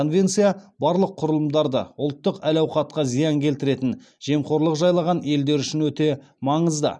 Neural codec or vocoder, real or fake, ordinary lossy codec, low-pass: none; real; none; none